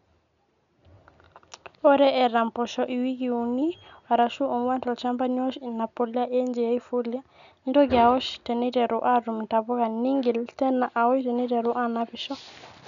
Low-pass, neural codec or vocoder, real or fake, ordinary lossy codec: 7.2 kHz; none; real; none